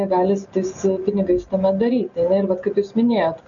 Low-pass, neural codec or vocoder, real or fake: 7.2 kHz; none; real